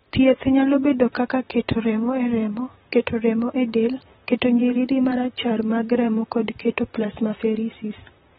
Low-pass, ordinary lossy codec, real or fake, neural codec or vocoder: 19.8 kHz; AAC, 16 kbps; fake; vocoder, 44.1 kHz, 128 mel bands, Pupu-Vocoder